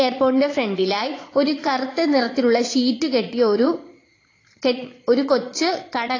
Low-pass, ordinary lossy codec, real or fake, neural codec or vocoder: 7.2 kHz; AAC, 32 kbps; fake; vocoder, 22.05 kHz, 80 mel bands, Vocos